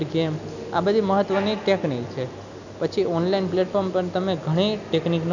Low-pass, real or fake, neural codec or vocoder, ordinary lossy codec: 7.2 kHz; real; none; none